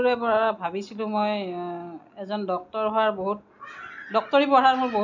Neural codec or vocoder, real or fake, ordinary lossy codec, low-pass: none; real; none; 7.2 kHz